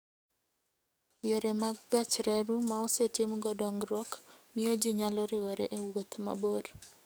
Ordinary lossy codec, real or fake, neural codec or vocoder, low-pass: none; fake; codec, 44.1 kHz, 7.8 kbps, DAC; none